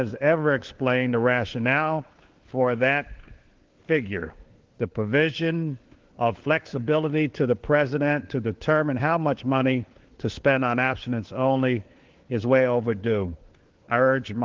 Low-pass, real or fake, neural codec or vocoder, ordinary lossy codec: 7.2 kHz; fake; codec, 16 kHz, 4 kbps, FunCodec, trained on LibriTTS, 50 frames a second; Opus, 16 kbps